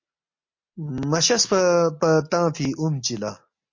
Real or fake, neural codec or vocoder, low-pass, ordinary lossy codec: real; none; 7.2 kHz; MP3, 48 kbps